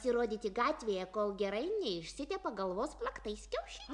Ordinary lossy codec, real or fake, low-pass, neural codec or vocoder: MP3, 96 kbps; real; 10.8 kHz; none